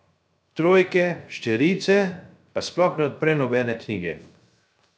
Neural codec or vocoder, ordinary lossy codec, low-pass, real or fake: codec, 16 kHz, 0.3 kbps, FocalCodec; none; none; fake